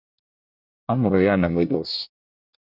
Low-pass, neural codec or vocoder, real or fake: 5.4 kHz; codec, 24 kHz, 1 kbps, SNAC; fake